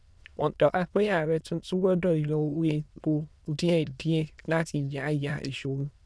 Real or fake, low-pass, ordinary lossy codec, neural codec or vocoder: fake; none; none; autoencoder, 22.05 kHz, a latent of 192 numbers a frame, VITS, trained on many speakers